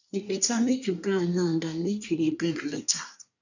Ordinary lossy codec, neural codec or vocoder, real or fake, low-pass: none; codec, 24 kHz, 1 kbps, SNAC; fake; 7.2 kHz